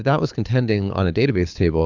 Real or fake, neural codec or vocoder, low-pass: fake; codec, 24 kHz, 6 kbps, HILCodec; 7.2 kHz